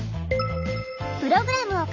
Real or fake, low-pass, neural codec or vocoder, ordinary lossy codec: real; 7.2 kHz; none; none